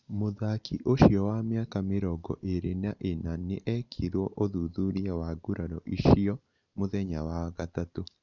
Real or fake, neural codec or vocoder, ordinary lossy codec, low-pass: real; none; none; 7.2 kHz